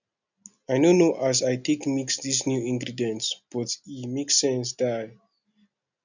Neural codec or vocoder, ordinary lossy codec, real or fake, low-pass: none; none; real; 7.2 kHz